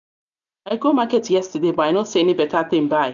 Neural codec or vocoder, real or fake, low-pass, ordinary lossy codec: none; real; 7.2 kHz; none